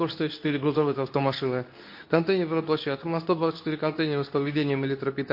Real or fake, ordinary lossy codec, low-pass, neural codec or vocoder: fake; MP3, 32 kbps; 5.4 kHz; codec, 24 kHz, 0.9 kbps, WavTokenizer, medium speech release version 2